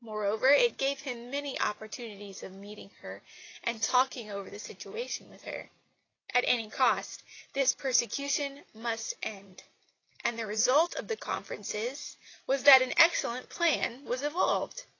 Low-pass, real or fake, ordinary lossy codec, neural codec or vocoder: 7.2 kHz; real; AAC, 32 kbps; none